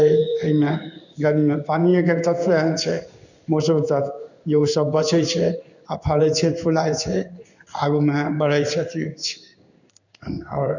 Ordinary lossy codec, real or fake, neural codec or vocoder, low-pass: none; fake; codec, 16 kHz in and 24 kHz out, 1 kbps, XY-Tokenizer; 7.2 kHz